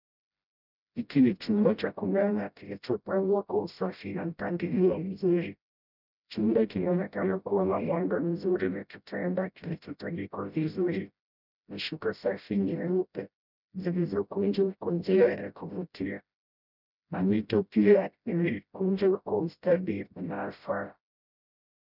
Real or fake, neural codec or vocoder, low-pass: fake; codec, 16 kHz, 0.5 kbps, FreqCodec, smaller model; 5.4 kHz